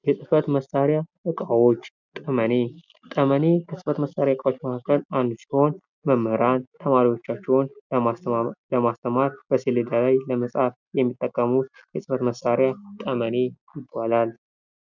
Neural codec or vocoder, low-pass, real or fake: none; 7.2 kHz; real